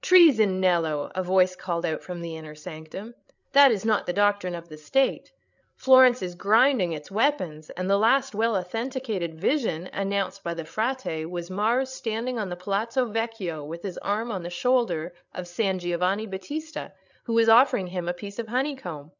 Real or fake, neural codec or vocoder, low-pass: fake; codec, 16 kHz, 8 kbps, FreqCodec, larger model; 7.2 kHz